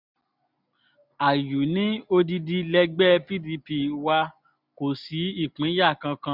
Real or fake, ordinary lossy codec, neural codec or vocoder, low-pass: real; none; none; 5.4 kHz